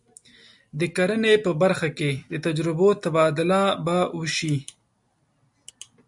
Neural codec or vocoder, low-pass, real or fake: none; 10.8 kHz; real